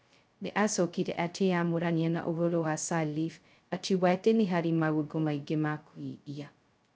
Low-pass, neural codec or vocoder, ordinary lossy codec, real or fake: none; codec, 16 kHz, 0.2 kbps, FocalCodec; none; fake